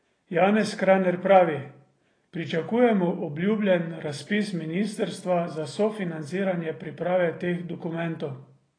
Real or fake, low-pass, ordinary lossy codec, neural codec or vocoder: real; 9.9 kHz; AAC, 32 kbps; none